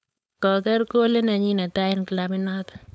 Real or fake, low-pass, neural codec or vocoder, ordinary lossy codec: fake; none; codec, 16 kHz, 4.8 kbps, FACodec; none